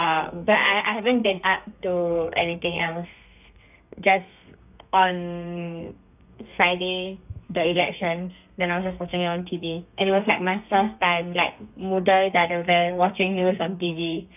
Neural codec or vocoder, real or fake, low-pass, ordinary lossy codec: codec, 32 kHz, 1.9 kbps, SNAC; fake; 3.6 kHz; none